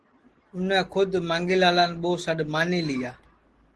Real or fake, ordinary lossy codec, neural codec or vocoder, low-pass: real; Opus, 16 kbps; none; 10.8 kHz